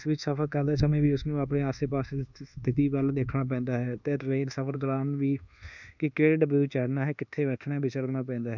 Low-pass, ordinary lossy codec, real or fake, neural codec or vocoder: 7.2 kHz; none; fake; codec, 24 kHz, 1.2 kbps, DualCodec